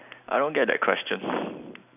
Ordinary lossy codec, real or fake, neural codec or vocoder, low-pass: none; real; none; 3.6 kHz